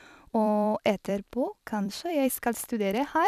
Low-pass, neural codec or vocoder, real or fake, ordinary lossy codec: 14.4 kHz; vocoder, 44.1 kHz, 128 mel bands every 256 samples, BigVGAN v2; fake; none